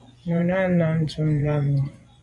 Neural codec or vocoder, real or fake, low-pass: vocoder, 24 kHz, 100 mel bands, Vocos; fake; 10.8 kHz